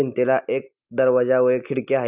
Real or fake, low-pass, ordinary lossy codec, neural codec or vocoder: real; 3.6 kHz; Opus, 64 kbps; none